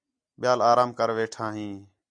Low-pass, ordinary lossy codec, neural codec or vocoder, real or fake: 9.9 kHz; MP3, 64 kbps; none; real